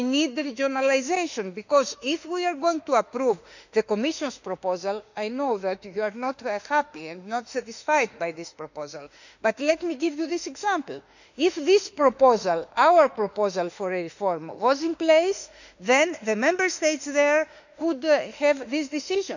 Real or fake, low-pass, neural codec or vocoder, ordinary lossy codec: fake; 7.2 kHz; autoencoder, 48 kHz, 32 numbers a frame, DAC-VAE, trained on Japanese speech; none